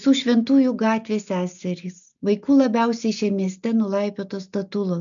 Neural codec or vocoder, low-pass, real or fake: none; 7.2 kHz; real